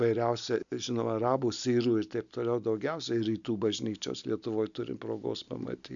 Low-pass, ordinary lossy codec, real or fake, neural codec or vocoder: 7.2 kHz; MP3, 96 kbps; real; none